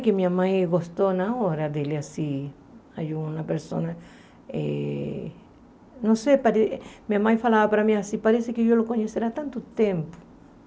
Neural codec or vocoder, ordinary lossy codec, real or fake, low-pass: none; none; real; none